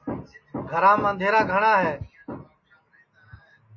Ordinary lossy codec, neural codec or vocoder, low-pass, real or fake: MP3, 32 kbps; none; 7.2 kHz; real